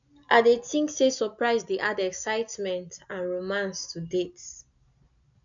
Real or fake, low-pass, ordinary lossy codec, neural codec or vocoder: real; 7.2 kHz; none; none